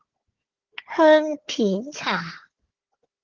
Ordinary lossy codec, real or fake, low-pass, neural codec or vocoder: Opus, 16 kbps; fake; 7.2 kHz; codec, 16 kHz, 4 kbps, FunCodec, trained on Chinese and English, 50 frames a second